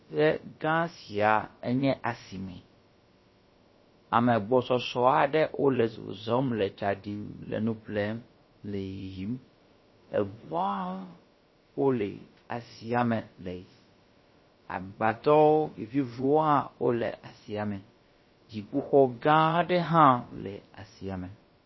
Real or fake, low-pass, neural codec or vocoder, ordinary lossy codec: fake; 7.2 kHz; codec, 16 kHz, about 1 kbps, DyCAST, with the encoder's durations; MP3, 24 kbps